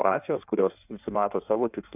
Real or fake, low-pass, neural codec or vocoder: fake; 3.6 kHz; codec, 16 kHz in and 24 kHz out, 1.1 kbps, FireRedTTS-2 codec